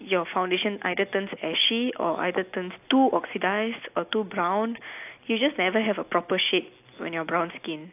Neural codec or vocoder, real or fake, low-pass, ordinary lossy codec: none; real; 3.6 kHz; AAC, 32 kbps